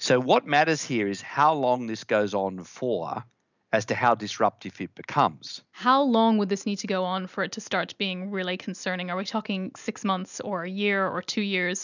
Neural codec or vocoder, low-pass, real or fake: none; 7.2 kHz; real